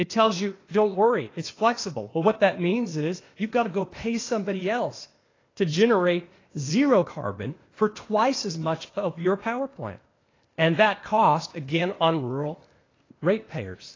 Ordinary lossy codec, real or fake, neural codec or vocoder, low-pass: AAC, 32 kbps; fake; codec, 16 kHz, 0.8 kbps, ZipCodec; 7.2 kHz